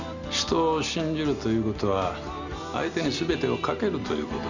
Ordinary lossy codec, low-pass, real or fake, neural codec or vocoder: none; 7.2 kHz; real; none